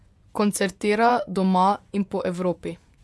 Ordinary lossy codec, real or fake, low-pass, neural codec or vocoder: none; fake; none; vocoder, 24 kHz, 100 mel bands, Vocos